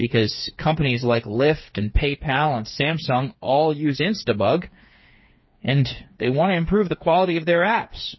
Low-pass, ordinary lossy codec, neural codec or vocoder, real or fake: 7.2 kHz; MP3, 24 kbps; codec, 16 kHz, 4 kbps, FreqCodec, smaller model; fake